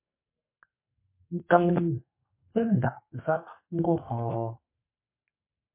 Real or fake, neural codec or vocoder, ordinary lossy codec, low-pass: fake; codec, 44.1 kHz, 2.6 kbps, SNAC; MP3, 24 kbps; 3.6 kHz